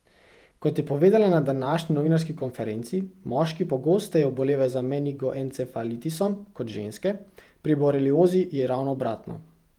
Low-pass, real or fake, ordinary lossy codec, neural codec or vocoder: 19.8 kHz; fake; Opus, 32 kbps; vocoder, 48 kHz, 128 mel bands, Vocos